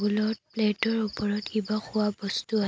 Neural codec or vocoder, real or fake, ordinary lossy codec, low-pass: none; real; none; none